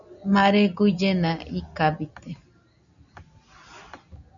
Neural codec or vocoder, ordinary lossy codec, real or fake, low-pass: none; MP3, 96 kbps; real; 7.2 kHz